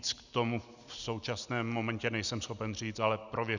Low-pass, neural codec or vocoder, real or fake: 7.2 kHz; none; real